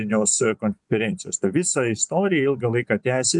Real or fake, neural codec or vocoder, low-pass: fake; vocoder, 24 kHz, 100 mel bands, Vocos; 10.8 kHz